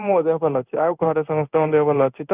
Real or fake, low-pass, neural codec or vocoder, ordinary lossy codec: fake; 3.6 kHz; codec, 16 kHz in and 24 kHz out, 1 kbps, XY-Tokenizer; none